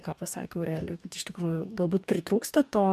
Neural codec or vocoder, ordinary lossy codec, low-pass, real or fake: codec, 44.1 kHz, 2.6 kbps, DAC; MP3, 96 kbps; 14.4 kHz; fake